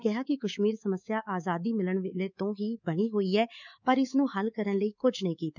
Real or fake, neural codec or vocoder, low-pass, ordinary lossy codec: fake; codec, 24 kHz, 3.1 kbps, DualCodec; 7.2 kHz; none